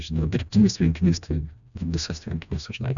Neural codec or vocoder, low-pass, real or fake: codec, 16 kHz, 1 kbps, FreqCodec, smaller model; 7.2 kHz; fake